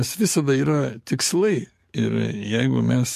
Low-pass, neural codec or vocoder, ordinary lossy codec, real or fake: 14.4 kHz; codec, 44.1 kHz, 7.8 kbps, DAC; MP3, 64 kbps; fake